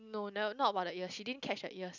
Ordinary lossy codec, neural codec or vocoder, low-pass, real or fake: none; none; 7.2 kHz; real